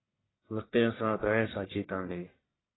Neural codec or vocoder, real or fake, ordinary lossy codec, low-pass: codec, 44.1 kHz, 1.7 kbps, Pupu-Codec; fake; AAC, 16 kbps; 7.2 kHz